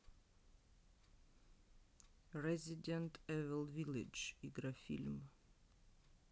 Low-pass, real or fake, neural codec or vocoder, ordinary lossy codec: none; real; none; none